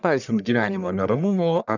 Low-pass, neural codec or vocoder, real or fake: 7.2 kHz; codec, 44.1 kHz, 1.7 kbps, Pupu-Codec; fake